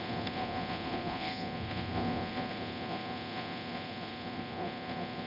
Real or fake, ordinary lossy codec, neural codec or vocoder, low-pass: fake; none; codec, 24 kHz, 0.9 kbps, DualCodec; 5.4 kHz